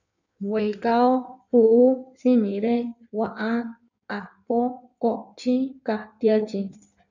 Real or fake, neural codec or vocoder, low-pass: fake; codec, 16 kHz in and 24 kHz out, 1.1 kbps, FireRedTTS-2 codec; 7.2 kHz